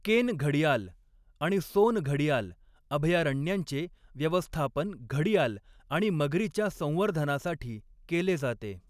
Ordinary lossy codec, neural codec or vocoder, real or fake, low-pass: none; none; real; 14.4 kHz